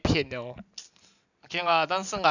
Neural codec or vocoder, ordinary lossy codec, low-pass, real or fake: none; none; 7.2 kHz; real